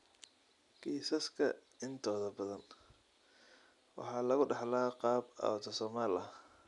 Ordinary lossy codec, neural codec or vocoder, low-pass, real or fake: none; none; 10.8 kHz; real